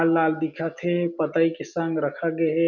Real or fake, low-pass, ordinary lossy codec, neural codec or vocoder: real; 7.2 kHz; none; none